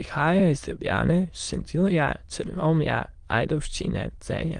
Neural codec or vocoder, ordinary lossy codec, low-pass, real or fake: autoencoder, 22.05 kHz, a latent of 192 numbers a frame, VITS, trained on many speakers; Opus, 24 kbps; 9.9 kHz; fake